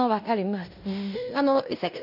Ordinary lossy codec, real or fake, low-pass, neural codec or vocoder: none; fake; 5.4 kHz; codec, 16 kHz in and 24 kHz out, 0.9 kbps, LongCat-Audio-Codec, fine tuned four codebook decoder